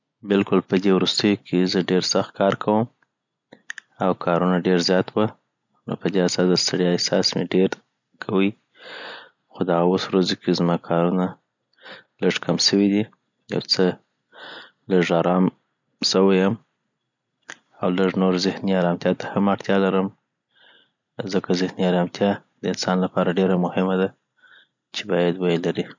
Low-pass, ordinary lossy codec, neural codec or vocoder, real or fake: 7.2 kHz; none; none; real